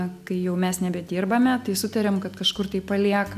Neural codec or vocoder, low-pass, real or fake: none; 14.4 kHz; real